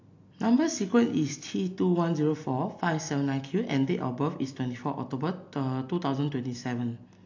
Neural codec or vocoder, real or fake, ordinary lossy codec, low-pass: none; real; AAC, 48 kbps; 7.2 kHz